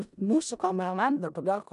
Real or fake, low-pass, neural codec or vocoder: fake; 10.8 kHz; codec, 16 kHz in and 24 kHz out, 0.4 kbps, LongCat-Audio-Codec, four codebook decoder